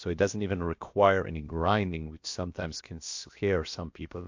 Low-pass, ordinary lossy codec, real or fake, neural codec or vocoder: 7.2 kHz; MP3, 48 kbps; fake; codec, 16 kHz, about 1 kbps, DyCAST, with the encoder's durations